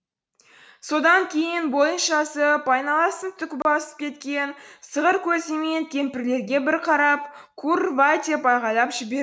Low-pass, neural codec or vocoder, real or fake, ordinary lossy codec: none; none; real; none